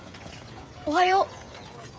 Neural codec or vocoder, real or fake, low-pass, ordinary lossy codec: codec, 16 kHz, 16 kbps, FreqCodec, smaller model; fake; none; none